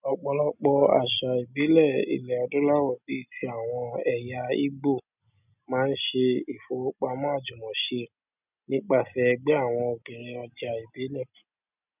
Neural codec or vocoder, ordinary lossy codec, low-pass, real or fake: none; none; 3.6 kHz; real